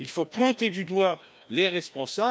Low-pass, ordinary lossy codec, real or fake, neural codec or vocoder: none; none; fake; codec, 16 kHz, 1 kbps, FreqCodec, larger model